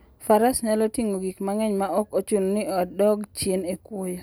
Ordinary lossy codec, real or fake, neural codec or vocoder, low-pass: none; real; none; none